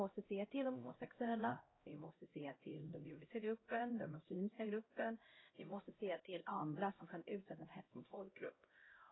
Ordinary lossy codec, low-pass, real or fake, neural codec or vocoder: AAC, 16 kbps; 7.2 kHz; fake; codec, 16 kHz, 0.5 kbps, X-Codec, HuBERT features, trained on LibriSpeech